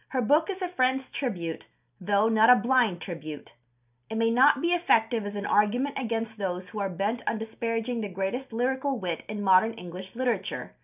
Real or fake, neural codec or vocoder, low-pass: real; none; 3.6 kHz